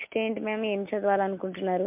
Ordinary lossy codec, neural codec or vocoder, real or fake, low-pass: MP3, 32 kbps; none; real; 3.6 kHz